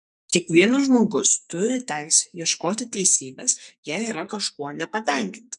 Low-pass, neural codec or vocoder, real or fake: 10.8 kHz; codec, 44.1 kHz, 2.6 kbps, SNAC; fake